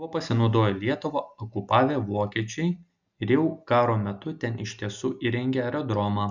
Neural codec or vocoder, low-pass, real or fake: none; 7.2 kHz; real